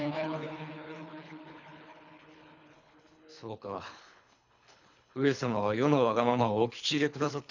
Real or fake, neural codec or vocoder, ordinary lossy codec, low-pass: fake; codec, 24 kHz, 3 kbps, HILCodec; none; 7.2 kHz